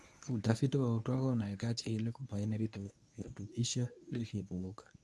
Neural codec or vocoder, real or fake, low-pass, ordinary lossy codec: codec, 24 kHz, 0.9 kbps, WavTokenizer, medium speech release version 1; fake; none; none